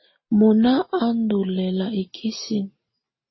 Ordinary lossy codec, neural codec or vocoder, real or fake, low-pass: MP3, 24 kbps; none; real; 7.2 kHz